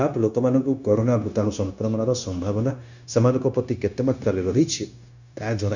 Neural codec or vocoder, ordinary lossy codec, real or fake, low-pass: codec, 16 kHz, 0.9 kbps, LongCat-Audio-Codec; none; fake; 7.2 kHz